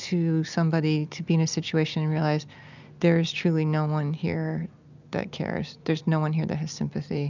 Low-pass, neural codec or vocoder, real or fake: 7.2 kHz; none; real